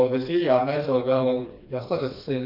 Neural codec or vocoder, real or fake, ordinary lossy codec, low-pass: codec, 16 kHz, 2 kbps, FreqCodec, smaller model; fake; none; 5.4 kHz